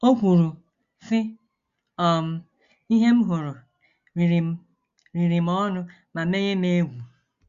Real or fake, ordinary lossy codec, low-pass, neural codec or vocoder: real; Opus, 64 kbps; 7.2 kHz; none